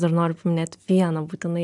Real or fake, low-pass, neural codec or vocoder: real; 10.8 kHz; none